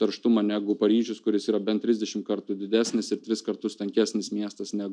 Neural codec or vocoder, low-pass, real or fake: none; 9.9 kHz; real